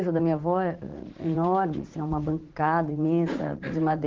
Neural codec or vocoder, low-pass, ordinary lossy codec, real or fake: vocoder, 44.1 kHz, 80 mel bands, Vocos; 7.2 kHz; Opus, 16 kbps; fake